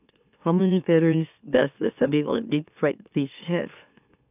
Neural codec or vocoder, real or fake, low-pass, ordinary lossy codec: autoencoder, 44.1 kHz, a latent of 192 numbers a frame, MeloTTS; fake; 3.6 kHz; none